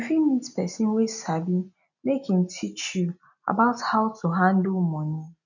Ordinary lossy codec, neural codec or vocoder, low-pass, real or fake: none; none; 7.2 kHz; real